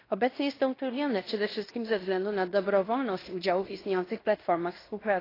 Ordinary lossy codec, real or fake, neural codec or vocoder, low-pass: AAC, 24 kbps; fake; codec, 16 kHz in and 24 kHz out, 0.9 kbps, LongCat-Audio-Codec, fine tuned four codebook decoder; 5.4 kHz